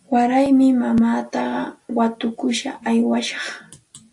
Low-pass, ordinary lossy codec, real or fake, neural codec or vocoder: 10.8 kHz; AAC, 64 kbps; real; none